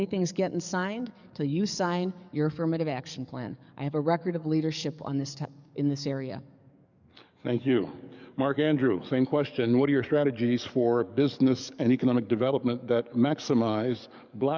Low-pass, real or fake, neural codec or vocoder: 7.2 kHz; fake; codec, 44.1 kHz, 7.8 kbps, DAC